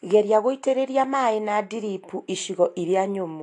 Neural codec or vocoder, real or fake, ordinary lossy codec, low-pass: none; real; AAC, 48 kbps; 10.8 kHz